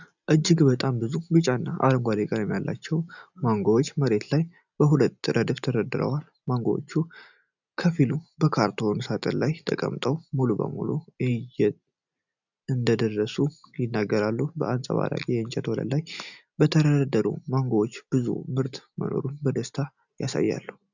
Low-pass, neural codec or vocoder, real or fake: 7.2 kHz; none; real